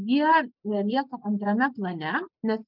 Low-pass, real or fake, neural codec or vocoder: 5.4 kHz; real; none